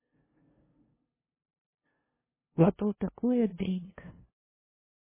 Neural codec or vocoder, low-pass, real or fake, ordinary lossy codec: codec, 16 kHz, 0.5 kbps, FunCodec, trained on LibriTTS, 25 frames a second; 3.6 kHz; fake; MP3, 16 kbps